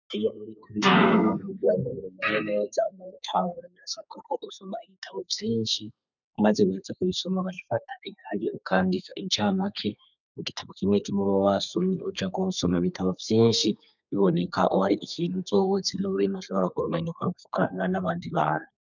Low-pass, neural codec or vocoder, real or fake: 7.2 kHz; codec, 32 kHz, 1.9 kbps, SNAC; fake